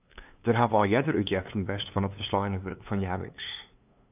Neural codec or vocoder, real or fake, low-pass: codec, 16 kHz, 2 kbps, FunCodec, trained on LibriTTS, 25 frames a second; fake; 3.6 kHz